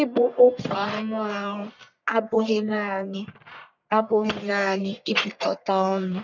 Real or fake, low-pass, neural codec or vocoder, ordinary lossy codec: fake; 7.2 kHz; codec, 44.1 kHz, 1.7 kbps, Pupu-Codec; none